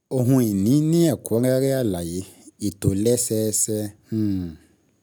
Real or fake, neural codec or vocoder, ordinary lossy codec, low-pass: real; none; none; none